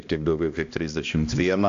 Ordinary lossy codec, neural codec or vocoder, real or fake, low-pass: AAC, 64 kbps; codec, 16 kHz, 1 kbps, X-Codec, HuBERT features, trained on balanced general audio; fake; 7.2 kHz